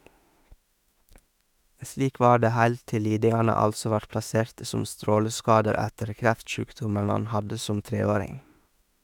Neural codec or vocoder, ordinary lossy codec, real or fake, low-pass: autoencoder, 48 kHz, 32 numbers a frame, DAC-VAE, trained on Japanese speech; none; fake; 19.8 kHz